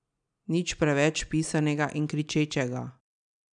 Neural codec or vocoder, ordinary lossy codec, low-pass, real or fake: none; none; 9.9 kHz; real